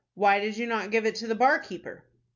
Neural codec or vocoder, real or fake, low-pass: none; real; 7.2 kHz